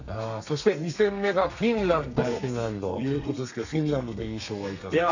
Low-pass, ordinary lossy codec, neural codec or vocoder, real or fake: 7.2 kHz; none; codec, 32 kHz, 1.9 kbps, SNAC; fake